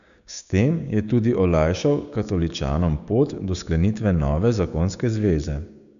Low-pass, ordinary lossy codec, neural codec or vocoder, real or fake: 7.2 kHz; none; codec, 16 kHz, 6 kbps, DAC; fake